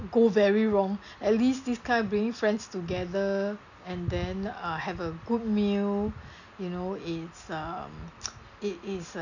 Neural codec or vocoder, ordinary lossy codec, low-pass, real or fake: none; none; 7.2 kHz; real